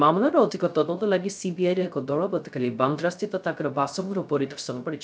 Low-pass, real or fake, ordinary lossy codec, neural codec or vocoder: none; fake; none; codec, 16 kHz, 0.3 kbps, FocalCodec